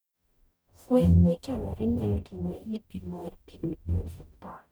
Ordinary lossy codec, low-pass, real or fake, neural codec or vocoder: none; none; fake; codec, 44.1 kHz, 0.9 kbps, DAC